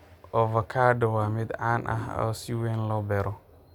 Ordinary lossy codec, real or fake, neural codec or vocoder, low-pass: none; real; none; 19.8 kHz